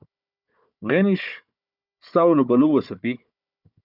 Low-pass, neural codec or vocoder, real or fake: 5.4 kHz; codec, 16 kHz, 4 kbps, FunCodec, trained on Chinese and English, 50 frames a second; fake